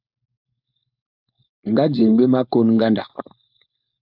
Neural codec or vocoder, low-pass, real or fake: codec, 16 kHz, 4.8 kbps, FACodec; 5.4 kHz; fake